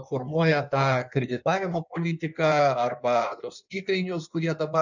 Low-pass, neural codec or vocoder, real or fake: 7.2 kHz; codec, 16 kHz in and 24 kHz out, 1.1 kbps, FireRedTTS-2 codec; fake